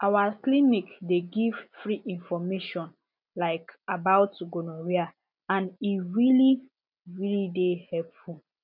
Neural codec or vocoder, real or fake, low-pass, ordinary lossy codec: none; real; 5.4 kHz; none